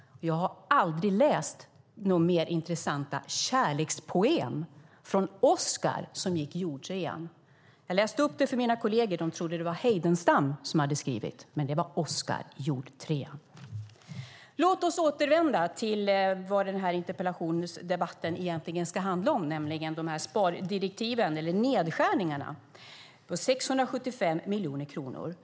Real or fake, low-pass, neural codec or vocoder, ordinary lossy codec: real; none; none; none